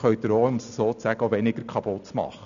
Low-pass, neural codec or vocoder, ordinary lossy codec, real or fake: 7.2 kHz; none; none; real